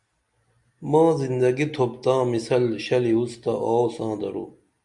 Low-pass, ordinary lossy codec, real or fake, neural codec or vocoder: 10.8 kHz; Opus, 64 kbps; real; none